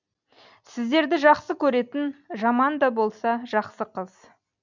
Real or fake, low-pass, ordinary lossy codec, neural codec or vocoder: real; 7.2 kHz; none; none